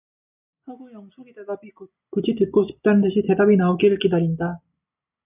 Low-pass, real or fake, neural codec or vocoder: 3.6 kHz; real; none